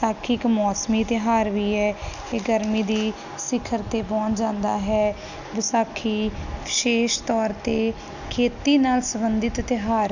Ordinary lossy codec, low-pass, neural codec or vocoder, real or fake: none; 7.2 kHz; none; real